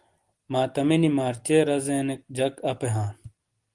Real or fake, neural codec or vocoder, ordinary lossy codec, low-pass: real; none; Opus, 24 kbps; 10.8 kHz